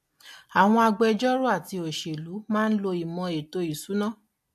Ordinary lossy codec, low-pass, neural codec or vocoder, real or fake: MP3, 64 kbps; 14.4 kHz; none; real